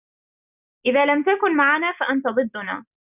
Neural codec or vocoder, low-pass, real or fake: none; 3.6 kHz; real